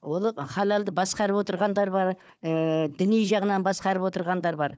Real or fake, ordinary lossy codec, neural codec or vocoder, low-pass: fake; none; codec, 16 kHz, 4 kbps, FunCodec, trained on Chinese and English, 50 frames a second; none